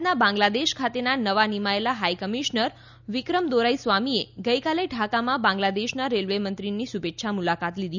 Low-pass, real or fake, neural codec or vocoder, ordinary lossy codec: 7.2 kHz; real; none; none